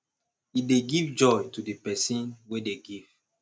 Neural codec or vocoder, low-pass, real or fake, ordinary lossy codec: none; none; real; none